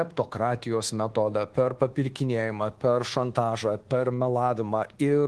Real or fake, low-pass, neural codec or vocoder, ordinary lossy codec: fake; 10.8 kHz; codec, 24 kHz, 1.2 kbps, DualCodec; Opus, 16 kbps